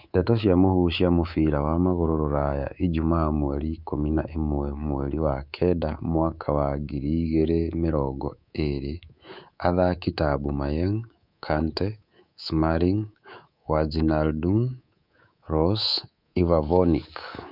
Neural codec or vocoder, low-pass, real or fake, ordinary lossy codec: codec, 24 kHz, 3.1 kbps, DualCodec; 5.4 kHz; fake; none